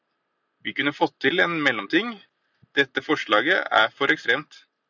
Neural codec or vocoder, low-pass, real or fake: none; 7.2 kHz; real